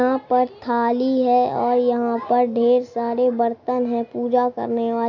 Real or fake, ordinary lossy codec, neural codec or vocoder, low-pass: real; AAC, 48 kbps; none; 7.2 kHz